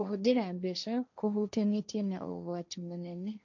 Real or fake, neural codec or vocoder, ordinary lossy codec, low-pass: fake; codec, 16 kHz, 1.1 kbps, Voila-Tokenizer; none; 7.2 kHz